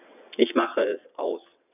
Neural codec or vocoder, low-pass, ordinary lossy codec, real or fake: vocoder, 22.05 kHz, 80 mel bands, WaveNeXt; 3.6 kHz; none; fake